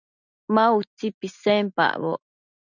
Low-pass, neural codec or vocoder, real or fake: 7.2 kHz; none; real